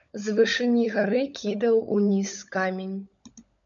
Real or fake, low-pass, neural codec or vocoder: fake; 7.2 kHz; codec, 16 kHz, 16 kbps, FunCodec, trained on LibriTTS, 50 frames a second